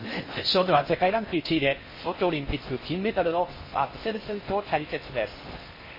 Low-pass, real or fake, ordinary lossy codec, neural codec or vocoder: 5.4 kHz; fake; MP3, 24 kbps; codec, 16 kHz in and 24 kHz out, 0.6 kbps, FocalCodec, streaming, 4096 codes